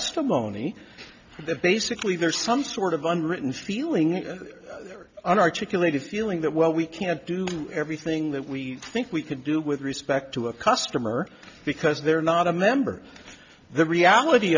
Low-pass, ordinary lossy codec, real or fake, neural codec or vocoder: 7.2 kHz; MP3, 64 kbps; real; none